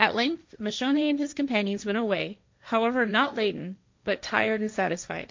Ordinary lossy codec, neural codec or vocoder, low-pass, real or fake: MP3, 64 kbps; codec, 16 kHz, 1.1 kbps, Voila-Tokenizer; 7.2 kHz; fake